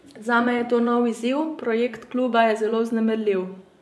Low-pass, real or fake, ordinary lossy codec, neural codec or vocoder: none; fake; none; vocoder, 24 kHz, 100 mel bands, Vocos